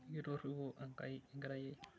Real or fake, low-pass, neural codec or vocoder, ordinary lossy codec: real; none; none; none